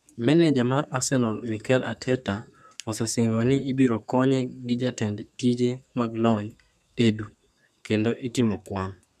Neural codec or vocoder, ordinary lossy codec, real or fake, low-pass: codec, 32 kHz, 1.9 kbps, SNAC; none; fake; 14.4 kHz